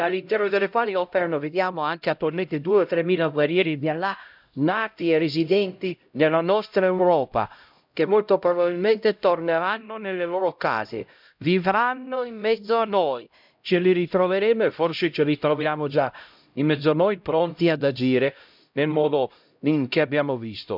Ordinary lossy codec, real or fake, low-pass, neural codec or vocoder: none; fake; 5.4 kHz; codec, 16 kHz, 0.5 kbps, X-Codec, HuBERT features, trained on LibriSpeech